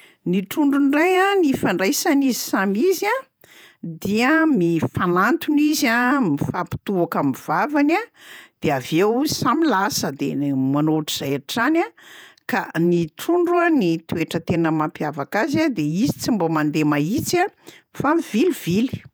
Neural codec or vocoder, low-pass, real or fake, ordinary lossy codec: vocoder, 48 kHz, 128 mel bands, Vocos; none; fake; none